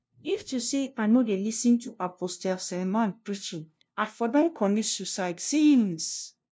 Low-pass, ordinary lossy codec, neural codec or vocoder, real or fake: none; none; codec, 16 kHz, 0.5 kbps, FunCodec, trained on LibriTTS, 25 frames a second; fake